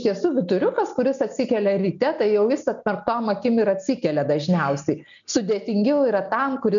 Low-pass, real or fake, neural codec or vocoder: 7.2 kHz; real; none